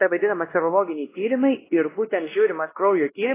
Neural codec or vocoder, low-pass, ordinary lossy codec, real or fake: codec, 16 kHz, 1 kbps, X-Codec, WavLM features, trained on Multilingual LibriSpeech; 3.6 kHz; AAC, 16 kbps; fake